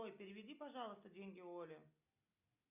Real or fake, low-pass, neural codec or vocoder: real; 3.6 kHz; none